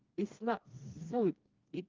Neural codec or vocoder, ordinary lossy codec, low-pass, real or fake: codec, 16 kHz, 2 kbps, FreqCodec, smaller model; Opus, 32 kbps; 7.2 kHz; fake